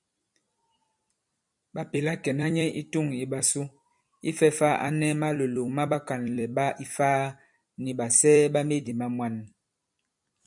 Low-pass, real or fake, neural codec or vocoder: 10.8 kHz; fake; vocoder, 44.1 kHz, 128 mel bands every 256 samples, BigVGAN v2